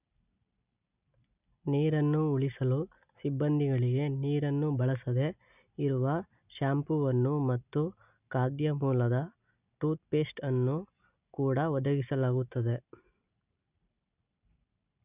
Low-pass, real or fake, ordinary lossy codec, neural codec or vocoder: 3.6 kHz; real; none; none